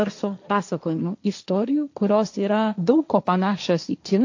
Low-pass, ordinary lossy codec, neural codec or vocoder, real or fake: 7.2 kHz; AAC, 48 kbps; codec, 16 kHz, 1.1 kbps, Voila-Tokenizer; fake